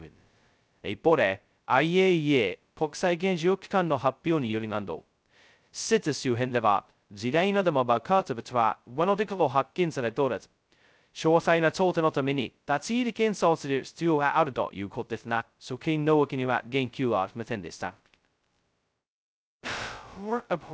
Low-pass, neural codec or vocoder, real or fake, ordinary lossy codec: none; codec, 16 kHz, 0.2 kbps, FocalCodec; fake; none